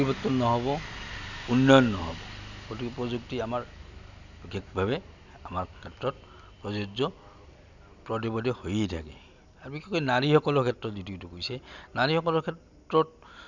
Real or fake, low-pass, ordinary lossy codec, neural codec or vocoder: real; 7.2 kHz; none; none